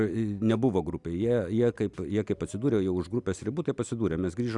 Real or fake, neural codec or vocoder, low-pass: real; none; 10.8 kHz